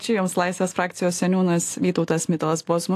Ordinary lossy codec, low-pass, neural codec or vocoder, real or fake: AAC, 64 kbps; 14.4 kHz; none; real